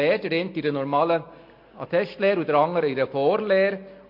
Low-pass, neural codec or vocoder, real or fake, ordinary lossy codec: 5.4 kHz; none; real; MP3, 32 kbps